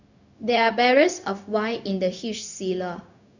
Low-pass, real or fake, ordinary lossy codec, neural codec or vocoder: 7.2 kHz; fake; none; codec, 16 kHz, 0.4 kbps, LongCat-Audio-Codec